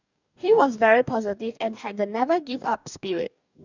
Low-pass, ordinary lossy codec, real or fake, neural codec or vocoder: 7.2 kHz; none; fake; codec, 44.1 kHz, 2.6 kbps, DAC